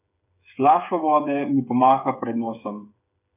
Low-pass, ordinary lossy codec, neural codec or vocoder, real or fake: 3.6 kHz; none; codec, 16 kHz, 8 kbps, FreqCodec, smaller model; fake